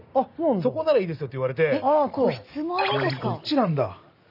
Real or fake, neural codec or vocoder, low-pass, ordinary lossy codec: real; none; 5.4 kHz; none